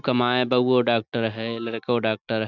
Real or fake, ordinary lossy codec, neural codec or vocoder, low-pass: real; none; none; 7.2 kHz